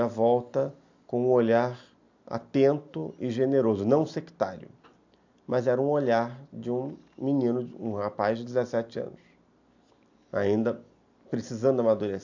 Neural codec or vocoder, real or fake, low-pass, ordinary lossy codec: none; real; 7.2 kHz; AAC, 48 kbps